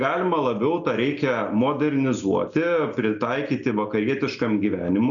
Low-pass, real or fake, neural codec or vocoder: 7.2 kHz; real; none